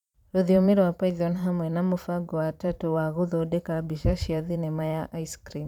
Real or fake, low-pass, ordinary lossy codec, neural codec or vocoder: fake; 19.8 kHz; none; vocoder, 44.1 kHz, 128 mel bands every 512 samples, BigVGAN v2